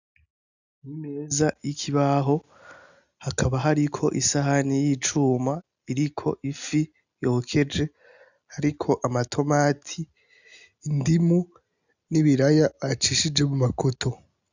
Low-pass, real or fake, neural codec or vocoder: 7.2 kHz; real; none